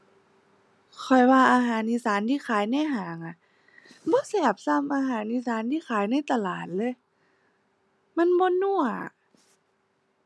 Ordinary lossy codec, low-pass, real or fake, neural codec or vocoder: none; none; real; none